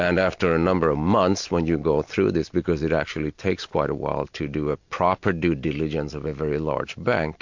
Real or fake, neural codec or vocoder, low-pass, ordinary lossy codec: real; none; 7.2 kHz; MP3, 48 kbps